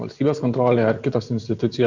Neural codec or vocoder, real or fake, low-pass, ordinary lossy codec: none; real; 7.2 kHz; Opus, 64 kbps